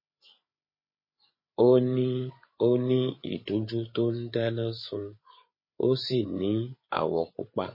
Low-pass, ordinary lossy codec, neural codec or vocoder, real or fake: 5.4 kHz; MP3, 24 kbps; codec, 16 kHz, 8 kbps, FreqCodec, larger model; fake